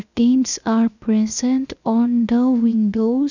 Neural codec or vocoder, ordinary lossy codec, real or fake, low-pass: codec, 16 kHz, 0.7 kbps, FocalCodec; none; fake; 7.2 kHz